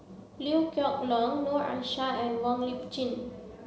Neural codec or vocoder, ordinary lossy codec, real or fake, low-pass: none; none; real; none